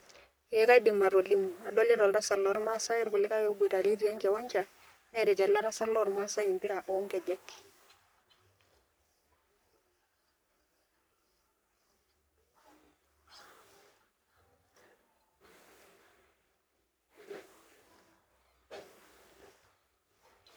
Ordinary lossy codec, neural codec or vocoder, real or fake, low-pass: none; codec, 44.1 kHz, 3.4 kbps, Pupu-Codec; fake; none